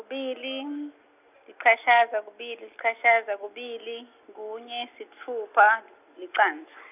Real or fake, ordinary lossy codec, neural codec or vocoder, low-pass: real; none; none; 3.6 kHz